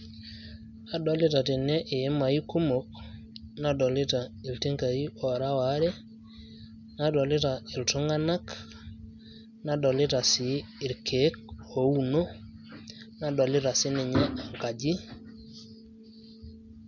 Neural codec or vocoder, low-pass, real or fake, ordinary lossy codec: none; 7.2 kHz; real; none